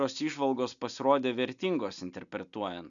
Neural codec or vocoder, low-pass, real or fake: none; 7.2 kHz; real